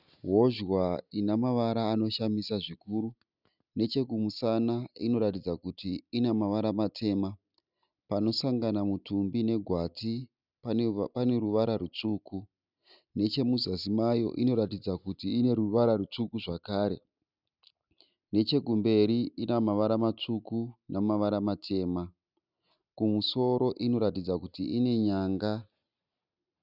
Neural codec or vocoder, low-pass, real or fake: none; 5.4 kHz; real